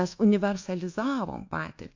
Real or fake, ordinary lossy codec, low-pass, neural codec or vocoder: fake; AAC, 48 kbps; 7.2 kHz; codec, 24 kHz, 1.2 kbps, DualCodec